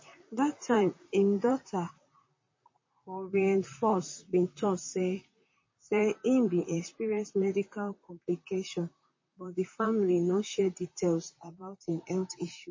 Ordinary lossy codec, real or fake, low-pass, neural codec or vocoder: MP3, 32 kbps; fake; 7.2 kHz; vocoder, 44.1 kHz, 128 mel bands, Pupu-Vocoder